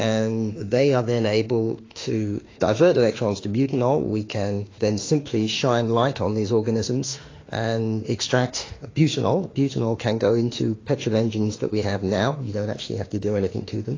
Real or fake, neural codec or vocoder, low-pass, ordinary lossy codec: fake; autoencoder, 48 kHz, 32 numbers a frame, DAC-VAE, trained on Japanese speech; 7.2 kHz; AAC, 32 kbps